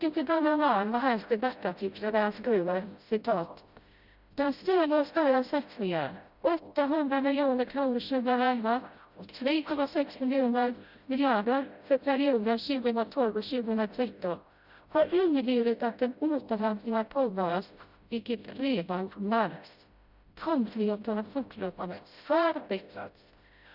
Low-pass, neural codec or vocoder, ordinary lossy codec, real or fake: 5.4 kHz; codec, 16 kHz, 0.5 kbps, FreqCodec, smaller model; Opus, 64 kbps; fake